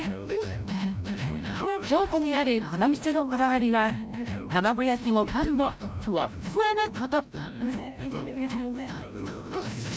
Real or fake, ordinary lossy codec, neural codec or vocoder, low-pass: fake; none; codec, 16 kHz, 0.5 kbps, FreqCodec, larger model; none